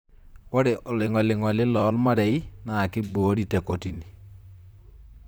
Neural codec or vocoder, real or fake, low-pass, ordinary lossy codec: vocoder, 44.1 kHz, 128 mel bands, Pupu-Vocoder; fake; none; none